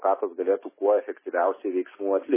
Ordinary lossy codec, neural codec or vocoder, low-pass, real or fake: MP3, 16 kbps; none; 3.6 kHz; real